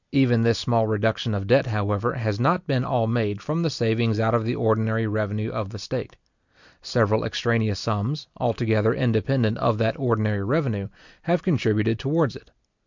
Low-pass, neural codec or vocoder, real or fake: 7.2 kHz; none; real